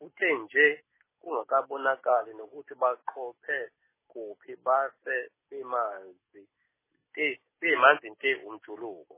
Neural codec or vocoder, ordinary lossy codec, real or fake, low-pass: none; MP3, 16 kbps; real; 3.6 kHz